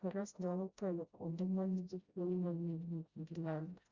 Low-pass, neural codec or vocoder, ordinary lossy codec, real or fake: 7.2 kHz; codec, 16 kHz, 0.5 kbps, FreqCodec, smaller model; Opus, 64 kbps; fake